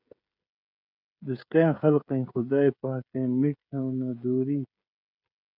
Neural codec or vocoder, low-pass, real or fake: codec, 16 kHz, 16 kbps, FreqCodec, smaller model; 5.4 kHz; fake